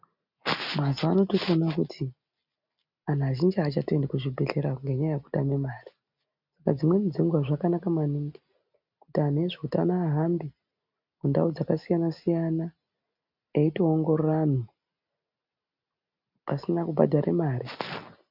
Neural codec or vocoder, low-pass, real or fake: none; 5.4 kHz; real